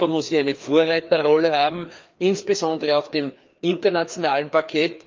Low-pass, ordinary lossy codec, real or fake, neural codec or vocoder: 7.2 kHz; Opus, 24 kbps; fake; codec, 16 kHz, 2 kbps, FreqCodec, larger model